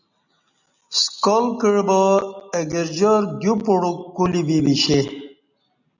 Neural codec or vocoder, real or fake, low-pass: none; real; 7.2 kHz